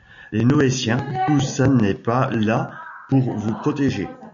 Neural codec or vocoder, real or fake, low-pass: none; real; 7.2 kHz